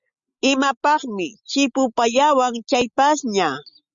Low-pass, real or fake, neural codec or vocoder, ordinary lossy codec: 7.2 kHz; real; none; Opus, 64 kbps